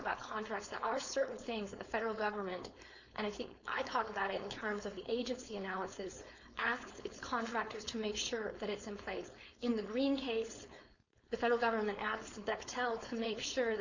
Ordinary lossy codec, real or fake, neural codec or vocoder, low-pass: Opus, 64 kbps; fake; codec, 16 kHz, 4.8 kbps, FACodec; 7.2 kHz